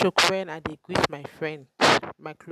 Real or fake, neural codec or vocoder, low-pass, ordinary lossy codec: real; none; 14.4 kHz; none